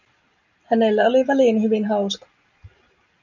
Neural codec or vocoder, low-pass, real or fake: none; 7.2 kHz; real